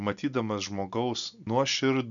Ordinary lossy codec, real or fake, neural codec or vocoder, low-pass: MP3, 96 kbps; real; none; 7.2 kHz